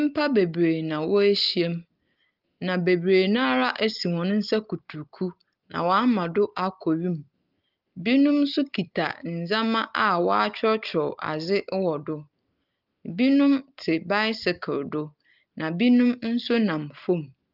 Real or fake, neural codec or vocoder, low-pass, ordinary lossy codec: real; none; 5.4 kHz; Opus, 32 kbps